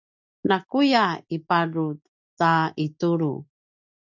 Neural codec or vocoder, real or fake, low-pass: none; real; 7.2 kHz